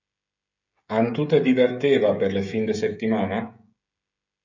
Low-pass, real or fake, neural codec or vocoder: 7.2 kHz; fake; codec, 16 kHz, 16 kbps, FreqCodec, smaller model